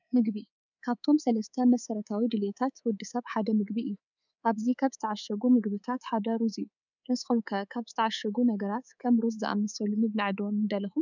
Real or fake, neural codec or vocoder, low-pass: fake; codec, 24 kHz, 3.1 kbps, DualCodec; 7.2 kHz